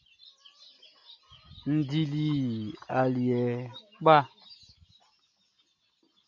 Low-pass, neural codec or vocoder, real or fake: 7.2 kHz; none; real